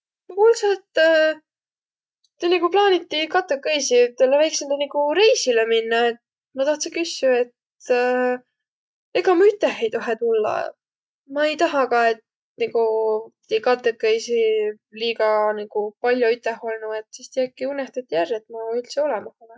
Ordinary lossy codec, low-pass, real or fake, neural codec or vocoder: none; none; real; none